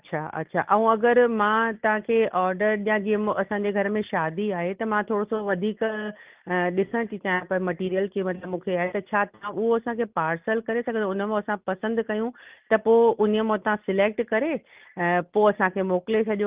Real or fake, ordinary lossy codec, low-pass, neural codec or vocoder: real; Opus, 32 kbps; 3.6 kHz; none